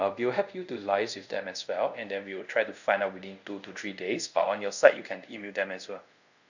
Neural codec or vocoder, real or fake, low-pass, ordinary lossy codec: codec, 24 kHz, 0.5 kbps, DualCodec; fake; 7.2 kHz; none